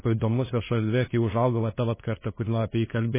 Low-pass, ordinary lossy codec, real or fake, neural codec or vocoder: 3.6 kHz; MP3, 16 kbps; fake; codec, 16 kHz, 2 kbps, FunCodec, trained on LibriTTS, 25 frames a second